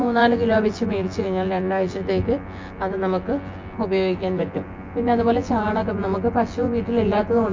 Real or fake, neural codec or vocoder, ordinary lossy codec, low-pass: fake; vocoder, 24 kHz, 100 mel bands, Vocos; MP3, 48 kbps; 7.2 kHz